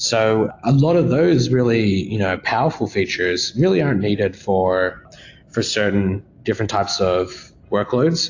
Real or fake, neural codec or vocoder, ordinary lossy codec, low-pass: real; none; AAC, 48 kbps; 7.2 kHz